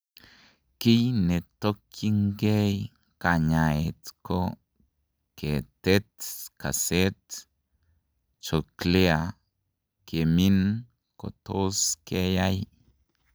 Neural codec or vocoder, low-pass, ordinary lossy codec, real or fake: none; none; none; real